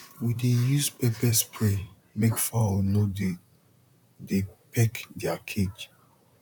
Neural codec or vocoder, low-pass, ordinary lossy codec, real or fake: vocoder, 44.1 kHz, 128 mel bands, Pupu-Vocoder; 19.8 kHz; none; fake